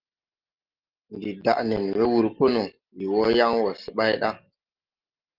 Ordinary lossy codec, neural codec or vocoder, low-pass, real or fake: Opus, 32 kbps; none; 5.4 kHz; real